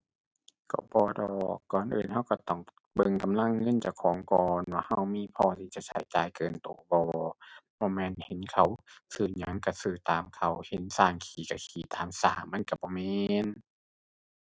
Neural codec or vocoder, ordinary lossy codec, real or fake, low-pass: none; none; real; none